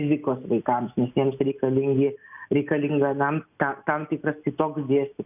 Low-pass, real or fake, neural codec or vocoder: 3.6 kHz; real; none